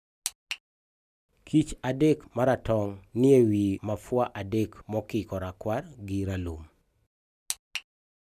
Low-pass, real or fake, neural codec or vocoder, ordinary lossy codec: 14.4 kHz; real; none; none